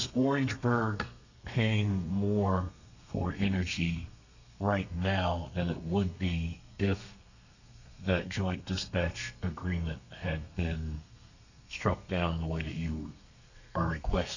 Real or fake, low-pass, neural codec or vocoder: fake; 7.2 kHz; codec, 32 kHz, 1.9 kbps, SNAC